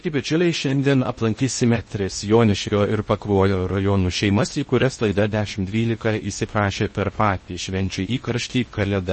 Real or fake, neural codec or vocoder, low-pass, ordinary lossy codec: fake; codec, 16 kHz in and 24 kHz out, 0.6 kbps, FocalCodec, streaming, 2048 codes; 10.8 kHz; MP3, 32 kbps